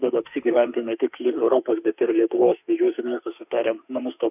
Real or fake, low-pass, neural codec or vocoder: fake; 3.6 kHz; autoencoder, 48 kHz, 32 numbers a frame, DAC-VAE, trained on Japanese speech